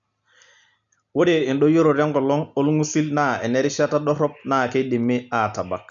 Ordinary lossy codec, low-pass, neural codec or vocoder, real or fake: none; 7.2 kHz; none; real